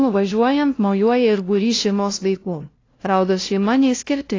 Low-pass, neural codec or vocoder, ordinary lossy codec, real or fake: 7.2 kHz; codec, 16 kHz, 0.5 kbps, FunCodec, trained on LibriTTS, 25 frames a second; AAC, 32 kbps; fake